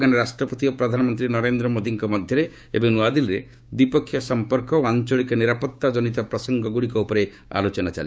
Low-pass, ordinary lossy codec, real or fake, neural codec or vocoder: none; none; fake; codec, 16 kHz, 6 kbps, DAC